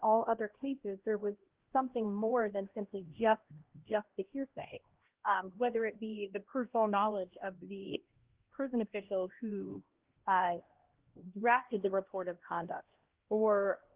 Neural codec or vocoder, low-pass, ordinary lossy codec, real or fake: codec, 16 kHz, 1 kbps, X-Codec, HuBERT features, trained on LibriSpeech; 3.6 kHz; Opus, 16 kbps; fake